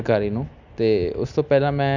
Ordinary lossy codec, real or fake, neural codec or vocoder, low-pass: none; real; none; 7.2 kHz